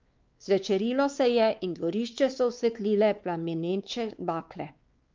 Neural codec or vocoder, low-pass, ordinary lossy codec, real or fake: codec, 16 kHz, 4 kbps, X-Codec, WavLM features, trained on Multilingual LibriSpeech; 7.2 kHz; Opus, 32 kbps; fake